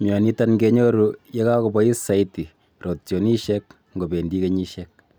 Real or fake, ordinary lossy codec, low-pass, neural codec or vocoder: real; none; none; none